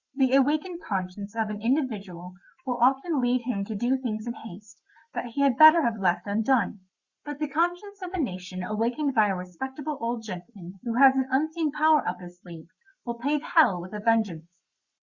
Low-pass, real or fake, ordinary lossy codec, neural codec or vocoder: 7.2 kHz; fake; Opus, 64 kbps; codec, 44.1 kHz, 7.8 kbps, Pupu-Codec